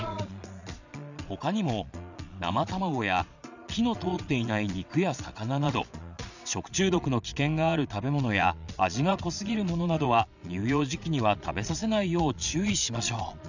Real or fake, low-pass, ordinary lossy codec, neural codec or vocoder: fake; 7.2 kHz; none; vocoder, 22.05 kHz, 80 mel bands, Vocos